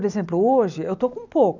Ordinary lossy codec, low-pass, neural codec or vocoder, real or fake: none; 7.2 kHz; none; real